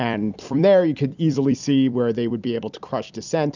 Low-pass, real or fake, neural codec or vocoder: 7.2 kHz; fake; vocoder, 44.1 kHz, 128 mel bands every 256 samples, BigVGAN v2